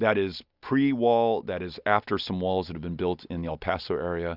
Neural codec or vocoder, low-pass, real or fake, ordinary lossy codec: none; 5.4 kHz; real; Opus, 64 kbps